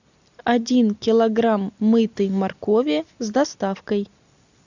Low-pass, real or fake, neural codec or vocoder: 7.2 kHz; real; none